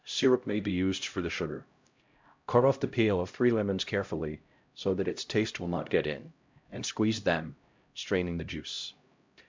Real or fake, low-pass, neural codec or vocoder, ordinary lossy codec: fake; 7.2 kHz; codec, 16 kHz, 0.5 kbps, X-Codec, HuBERT features, trained on LibriSpeech; MP3, 64 kbps